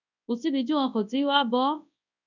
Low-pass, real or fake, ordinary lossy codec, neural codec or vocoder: 7.2 kHz; fake; none; codec, 24 kHz, 0.9 kbps, WavTokenizer, large speech release